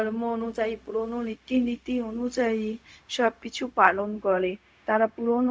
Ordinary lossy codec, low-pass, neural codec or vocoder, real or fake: none; none; codec, 16 kHz, 0.4 kbps, LongCat-Audio-Codec; fake